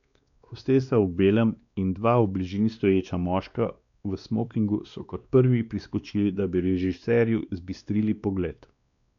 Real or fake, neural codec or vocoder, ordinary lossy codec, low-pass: fake; codec, 16 kHz, 2 kbps, X-Codec, WavLM features, trained on Multilingual LibriSpeech; none; 7.2 kHz